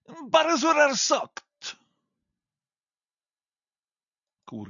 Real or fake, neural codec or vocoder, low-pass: real; none; 7.2 kHz